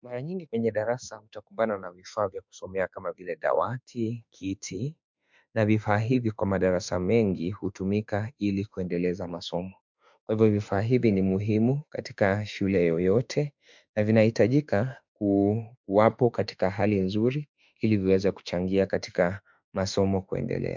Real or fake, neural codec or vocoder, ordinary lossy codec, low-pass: fake; autoencoder, 48 kHz, 32 numbers a frame, DAC-VAE, trained on Japanese speech; MP3, 64 kbps; 7.2 kHz